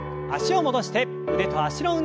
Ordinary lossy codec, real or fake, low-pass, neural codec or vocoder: none; real; none; none